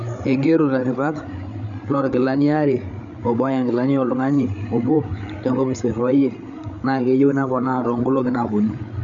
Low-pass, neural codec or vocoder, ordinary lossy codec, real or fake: 7.2 kHz; codec, 16 kHz, 8 kbps, FreqCodec, larger model; none; fake